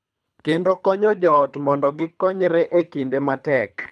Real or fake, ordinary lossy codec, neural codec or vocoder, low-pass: fake; none; codec, 24 kHz, 3 kbps, HILCodec; none